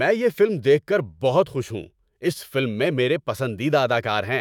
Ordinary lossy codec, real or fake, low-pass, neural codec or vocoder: none; fake; 19.8 kHz; autoencoder, 48 kHz, 128 numbers a frame, DAC-VAE, trained on Japanese speech